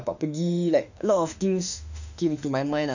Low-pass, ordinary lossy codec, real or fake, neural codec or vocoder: 7.2 kHz; none; fake; autoencoder, 48 kHz, 32 numbers a frame, DAC-VAE, trained on Japanese speech